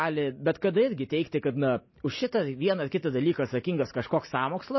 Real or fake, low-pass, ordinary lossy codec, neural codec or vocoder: real; 7.2 kHz; MP3, 24 kbps; none